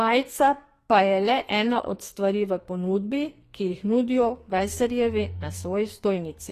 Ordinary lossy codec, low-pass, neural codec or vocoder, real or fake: AAC, 48 kbps; 14.4 kHz; codec, 44.1 kHz, 2.6 kbps, SNAC; fake